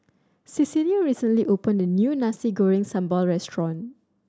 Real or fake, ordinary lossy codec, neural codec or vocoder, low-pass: real; none; none; none